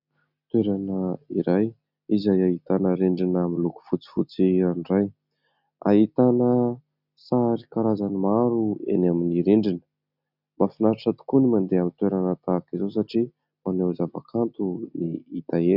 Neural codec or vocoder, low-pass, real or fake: none; 5.4 kHz; real